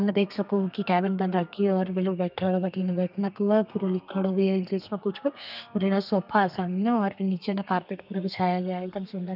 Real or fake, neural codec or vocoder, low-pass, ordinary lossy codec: fake; codec, 32 kHz, 1.9 kbps, SNAC; 5.4 kHz; none